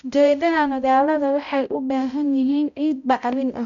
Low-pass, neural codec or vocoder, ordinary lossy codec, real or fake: 7.2 kHz; codec, 16 kHz, 0.5 kbps, X-Codec, HuBERT features, trained on balanced general audio; none; fake